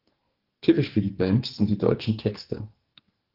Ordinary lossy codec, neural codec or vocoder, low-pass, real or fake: Opus, 16 kbps; codec, 44.1 kHz, 2.6 kbps, SNAC; 5.4 kHz; fake